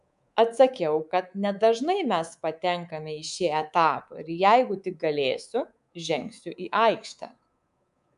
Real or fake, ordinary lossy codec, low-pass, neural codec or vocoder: fake; MP3, 96 kbps; 10.8 kHz; codec, 24 kHz, 3.1 kbps, DualCodec